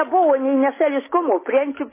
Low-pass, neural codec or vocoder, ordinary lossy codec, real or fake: 3.6 kHz; none; MP3, 16 kbps; real